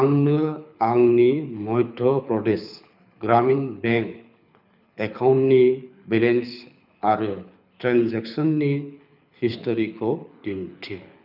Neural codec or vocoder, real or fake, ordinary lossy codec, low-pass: codec, 24 kHz, 6 kbps, HILCodec; fake; none; 5.4 kHz